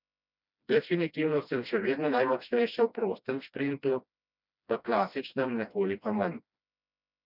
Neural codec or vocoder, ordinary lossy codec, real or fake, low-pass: codec, 16 kHz, 1 kbps, FreqCodec, smaller model; none; fake; 5.4 kHz